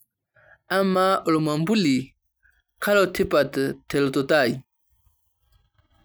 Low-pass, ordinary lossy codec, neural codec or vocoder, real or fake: none; none; none; real